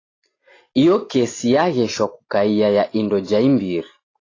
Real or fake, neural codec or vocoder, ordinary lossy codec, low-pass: real; none; AAC, 32 kbps; 7.2 kHz